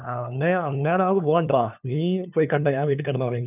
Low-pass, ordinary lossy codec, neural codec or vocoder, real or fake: 3.6 kHz; none; codec, 16 kHz, 2 kbps, FunCodec, trained on LibriTTS, 25 frames a second; fake